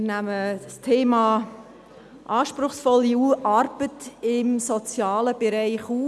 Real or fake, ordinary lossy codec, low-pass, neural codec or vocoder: real; none; none; none